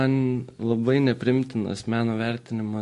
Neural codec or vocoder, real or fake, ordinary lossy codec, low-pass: none; real; MP3, 48 kbps; 14.4 kHz